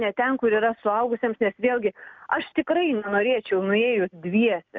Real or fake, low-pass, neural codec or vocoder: real; 7.2 kHz; none